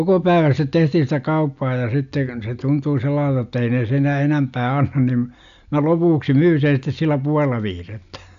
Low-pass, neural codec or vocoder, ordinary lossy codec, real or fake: 7.2 kHz; none; AAC, 96 kbps; real